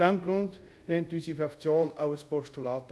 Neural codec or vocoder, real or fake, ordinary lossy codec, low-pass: codec, 24 kHz, 0.5 kbps, DualCodec; fake; none; none